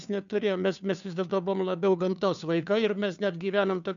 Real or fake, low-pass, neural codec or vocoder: fake; 7.2 kHz; codec, 16 kHz, 2 kbps, FunCodec, trained on Chinese and English, 25 frames a second